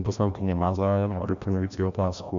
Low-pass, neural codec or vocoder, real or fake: 7.2 kHz; codec, 16 kHz, 1 kbps, FreqCodec, larger model; fake